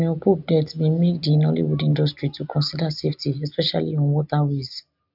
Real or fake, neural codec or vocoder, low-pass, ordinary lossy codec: real; none; 5.4 kHz; none